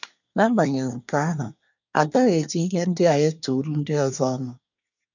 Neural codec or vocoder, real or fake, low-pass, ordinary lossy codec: codec, 24 kHz, 1 kbps, SNAC; fake; 7.2 kHz; none